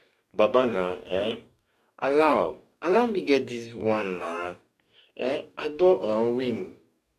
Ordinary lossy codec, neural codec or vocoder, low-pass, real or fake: none; codec, 44.1 kHz, 2.6 kbps, DAC; 14.4 kHz; fake